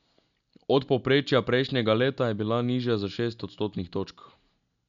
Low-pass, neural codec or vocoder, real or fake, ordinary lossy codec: 7.2 kHz; none; real; none